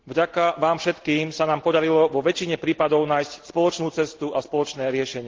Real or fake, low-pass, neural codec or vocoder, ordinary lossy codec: real; 7.2 kHz; none; Opus, 16 kbps